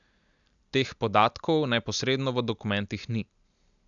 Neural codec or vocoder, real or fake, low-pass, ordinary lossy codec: none; real; 7.2 kHz; none